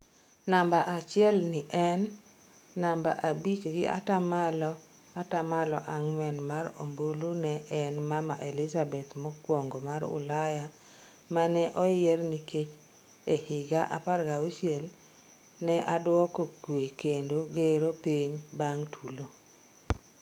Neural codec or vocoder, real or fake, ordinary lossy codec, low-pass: codec, 44.1 kHz, 7.8 kbps, DAC; fake; none; 19.8 kHz